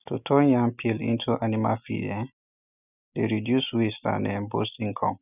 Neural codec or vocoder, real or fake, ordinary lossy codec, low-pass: none; real; none; 3.6 kHz